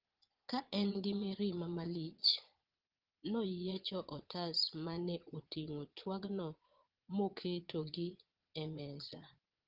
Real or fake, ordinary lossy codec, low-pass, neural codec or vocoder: fake; Opus, 16 kbps; 5.4 kHz; vocoder, 22.05 kHz, 80 mel bands, Vocos